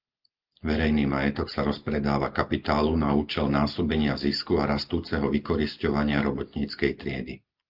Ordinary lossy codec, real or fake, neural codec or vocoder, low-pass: Opus, 24 kbps; real; none; 5.4 kHz